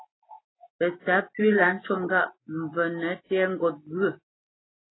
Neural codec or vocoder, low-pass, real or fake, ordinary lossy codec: none; 7.2 kHz; real; AAC, 16 kbps